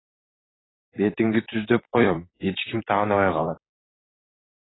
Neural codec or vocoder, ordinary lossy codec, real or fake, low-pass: vocoder, 44.1 kHz, 128 mel bands, Pupu-Vocoder; AAC, 16 kbps; fake; 7.2 kHz